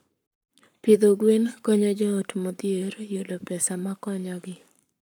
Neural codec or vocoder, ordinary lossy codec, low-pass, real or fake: codec, 44.1 kHz, 7.8 kbps, Pupu-Codec; none; none; fake